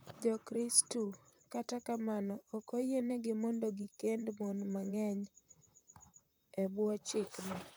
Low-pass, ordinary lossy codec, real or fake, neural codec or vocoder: none; none; fake; vocoder, 44.1 kHz, 128 mel bands, Pupu-Vocoder